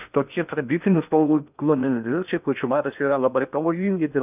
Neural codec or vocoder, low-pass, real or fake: codec, 16 kHz in and 24 kHz out, 0.6 kbps, FocalCodec, streaming, 2048 codes; 3.6 kHz; fake